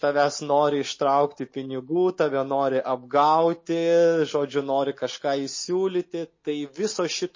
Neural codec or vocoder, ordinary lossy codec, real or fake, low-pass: none; MP3, 32 kbps; real; 7.2 kHz